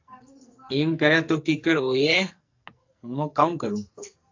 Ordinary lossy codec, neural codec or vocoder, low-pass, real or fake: AAC, 48 kbps; codec, 44.1 kHz, 2.6 kbps, SNAC; 7.2 kHz; fake